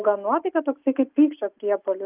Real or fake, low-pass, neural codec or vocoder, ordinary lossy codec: real; 3.6 kHz; none; Opus, 24 kbps